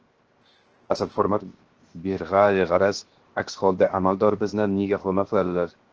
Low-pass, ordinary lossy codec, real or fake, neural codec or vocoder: 7.2 kHz; Opus, 16 kbps; fake; codec, 16 kHz, 0.7 kbps, FocalCodec